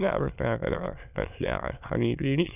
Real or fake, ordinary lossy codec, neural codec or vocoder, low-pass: fake; none; autoencoder, 22.05 kHz, a latent of 192 numbers a frame, VITS, trained on many speakers; 3.6 kHz